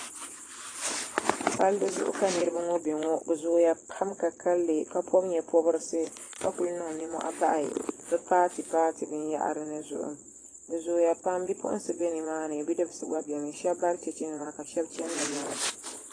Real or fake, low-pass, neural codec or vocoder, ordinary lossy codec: fake; 9.9 kHz; vocoder, 22.05 kHz, 80 mel bands, Vocos; AAC, 32 kbps